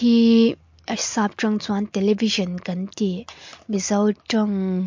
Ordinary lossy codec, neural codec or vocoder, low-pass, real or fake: MP3, 48 kbps; none; 7.2 kHz; real